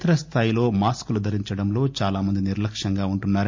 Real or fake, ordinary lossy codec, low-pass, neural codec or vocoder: real; MP3, 48 kbps; 7.2 kHz; none